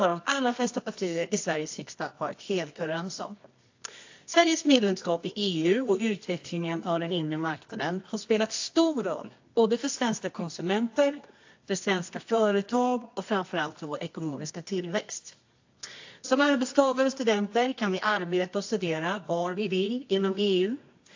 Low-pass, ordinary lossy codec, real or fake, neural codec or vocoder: 7.2 kHz; AAC, 48 kbps; fake; codec, 24 kHz, 0.9 kbps, WavTokenizer, medium music audio release